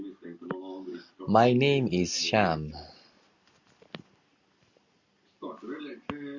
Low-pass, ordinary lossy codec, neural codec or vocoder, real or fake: 7.2 kHz; Opus, 64 kbps; none; real